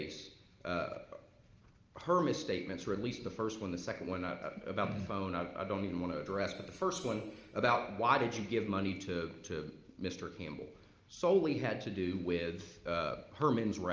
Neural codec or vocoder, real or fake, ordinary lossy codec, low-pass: none; real; Opus, 24 kbps; 7.2 kHz